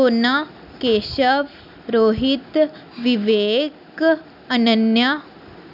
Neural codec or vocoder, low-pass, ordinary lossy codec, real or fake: none; 5.4 kHz; none; real